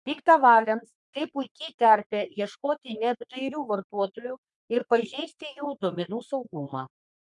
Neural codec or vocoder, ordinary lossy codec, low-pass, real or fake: codec, 44.1 kHz, 3.4 kbps, Pupu-Codec; MP3, 96 kbps; 10.8 kHz; fake